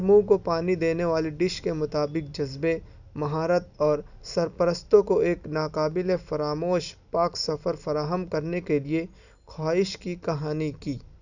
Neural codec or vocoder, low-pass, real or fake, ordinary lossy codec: none; 7.2 kHz; real; none